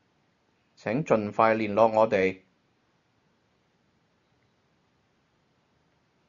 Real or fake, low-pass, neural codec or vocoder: real; 7.2 kHz; none